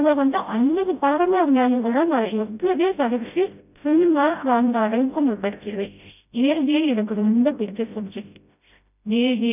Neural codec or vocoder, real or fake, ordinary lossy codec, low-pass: codec, 16 kHz, 0.5 kbps, FreqCodec, smaller model; fake; none; 3.6 kHz